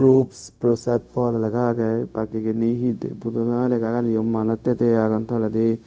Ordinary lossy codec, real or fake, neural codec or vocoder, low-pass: none; fake; codec, 16 kHz, 0.4 kbps, LongCat-Audio-Codec; none